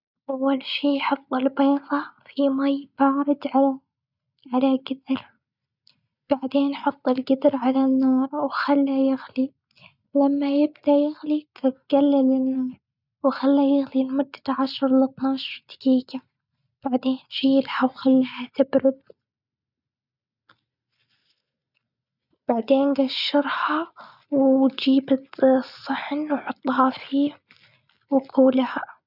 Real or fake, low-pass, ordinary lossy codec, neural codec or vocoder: real; 5.4 kHz; none; none